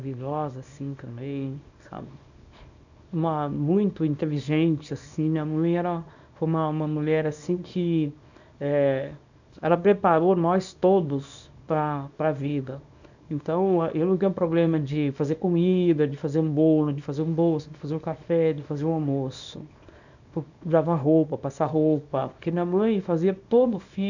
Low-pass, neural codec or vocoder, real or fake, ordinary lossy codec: 7.2 kHz; codec, 24 kHz, 0.9 kbps, WavTokenizer, small release; fake; none